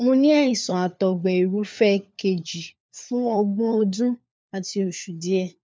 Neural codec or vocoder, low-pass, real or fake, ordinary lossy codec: codec, 16 kHz, 4 kbps, FunCodec, trained on LibriTTS, 50 frames a second; none; fake; none